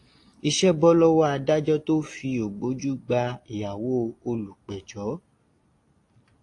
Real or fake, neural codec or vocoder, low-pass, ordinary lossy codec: real; none; 10.8 kHz; AAC, 48 kbps